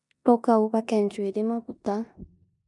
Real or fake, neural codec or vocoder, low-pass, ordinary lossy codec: fake; codec, 16 kHz in and 24 kHz out, 0.9 kbps, LongCat-Audio-Codec, four codebook decoder; 10.8 kHz; none